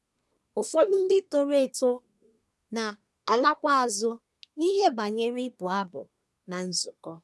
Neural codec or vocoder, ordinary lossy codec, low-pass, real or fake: codec, 24 kHz, 1 kbps, SNAC; none; none; fake